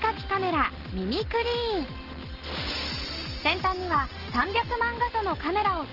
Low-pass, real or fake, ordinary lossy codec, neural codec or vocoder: 5.4 kHz; real; Opus, 16 kbps; none